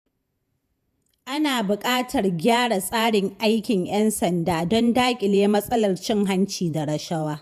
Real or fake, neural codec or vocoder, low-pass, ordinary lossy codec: fake; vocoder, 48 kHz, 128 mel bands, Vocos; 14.4 kHz; none